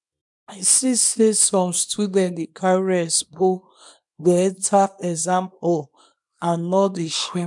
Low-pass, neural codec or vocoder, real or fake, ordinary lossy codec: 10.8 kHz; codec, 24 kHz, 0.9 kbps, WavTokenizer, small release; fake; MP3, 96 kbps